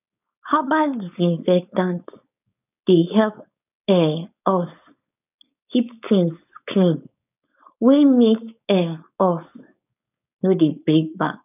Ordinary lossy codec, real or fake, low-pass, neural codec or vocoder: none; fake; 3.6 kHz; codec, 16 kHz, 4.8 kbps, FACodec